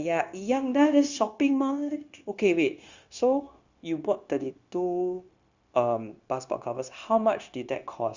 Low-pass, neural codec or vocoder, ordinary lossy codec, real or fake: 7.2 kHz; codec, 16 kHz, 0.9 kbps, LongCat-Audio-Codec; Opus, 64 kbps; fake